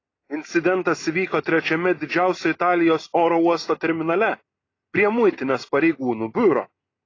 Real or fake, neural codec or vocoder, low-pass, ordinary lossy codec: real; none; 7.2 kHz; AAC, 32 kbps